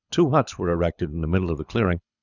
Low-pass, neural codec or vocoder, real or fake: 7.2 kHz; codec, 24 kHz, 6 kbps, HILCodec; fake